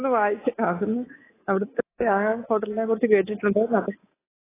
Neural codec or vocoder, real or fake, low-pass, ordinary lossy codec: codec, 24 kHz, 3.1 kbps, DualCodec; fake; 3.6 kHz; AAC, 16 kbps